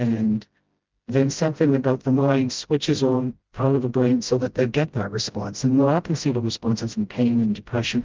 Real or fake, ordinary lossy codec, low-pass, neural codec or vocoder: fake; Opus, 32 kbps; 7.2 kHz; codec, 16 kHz, 0.5 kbps, FreqCodec, smaller model